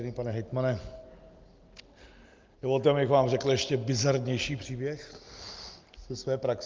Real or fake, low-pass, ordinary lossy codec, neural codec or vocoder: real; 7.2 kHz; Opus, 32 kbps; none